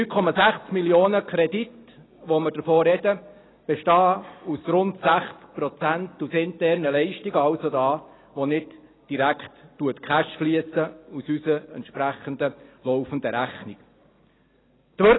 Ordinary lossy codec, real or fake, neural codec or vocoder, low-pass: AAC, 16 kbps; real; none; 7.2 kHz